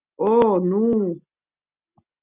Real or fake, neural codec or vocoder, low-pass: real; none; 3.6 kHz